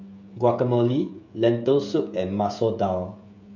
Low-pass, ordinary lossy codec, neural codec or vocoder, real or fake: 7.2 kHz; none; codec, 16 kHz, 16 kbps, FreqCodec, smaller model; fake